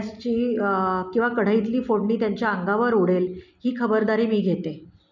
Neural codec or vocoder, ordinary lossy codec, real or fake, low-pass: none; none; real; 7.2 kHz